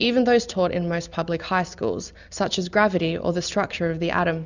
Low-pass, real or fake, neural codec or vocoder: 7.2 kHz; real; none